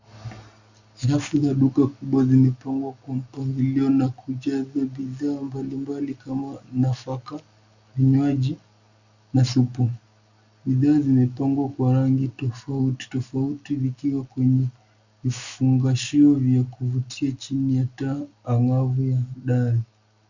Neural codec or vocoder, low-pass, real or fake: none; 7.2 kHz; real